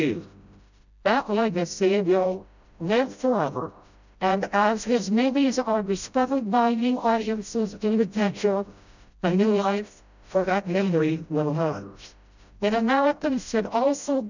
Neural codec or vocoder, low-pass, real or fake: codec, 16 kHz, 0.5 kbps, FreqCodec, smaller model; 7.2 kHz; fake